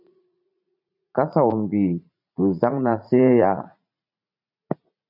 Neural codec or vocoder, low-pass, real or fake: vocoder, 44.1 kHz, 80 mel bands, Vocos; 5.4 kHz; fake